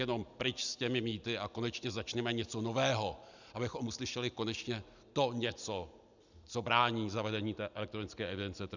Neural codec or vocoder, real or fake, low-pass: none; real; 7.2 kHz